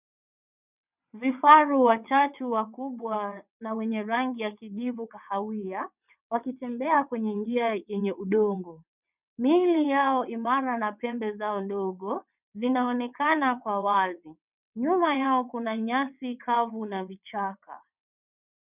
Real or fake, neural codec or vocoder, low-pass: fake; vocoder, 22.05 kHz, 80 mel bands, WaveNeXt; 3.6 kHz